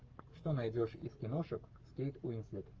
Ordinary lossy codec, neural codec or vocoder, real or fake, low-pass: Opus, 24 kbps; codec, 44.1 kHz, 7.8 kbps, Pupu-Codec; fake; 7.2 kHz